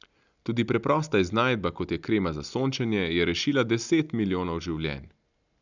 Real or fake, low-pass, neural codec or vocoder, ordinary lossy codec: real; 7.2 kHz; none; none